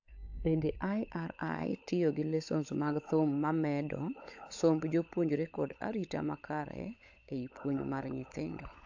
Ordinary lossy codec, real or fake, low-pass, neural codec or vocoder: AAC, 48 kbps; fake; 7.2 kHz; codec, 16 kHz, 16 kbps, FunCodec, trained on LibriTTS, 50 frames a second